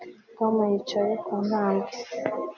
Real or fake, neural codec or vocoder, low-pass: real; none; 7.2 kHz